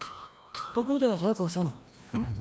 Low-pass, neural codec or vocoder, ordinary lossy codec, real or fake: none; codec, 16 kHz, 1 kbps, FreqCodec, larger model; none; fake